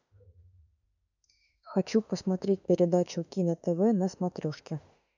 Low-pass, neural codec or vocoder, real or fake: 7.2 kHz; autoencoder, 48 kHz, 32 numbers a frame, DAC-VAE, trained on Japanese speech; fake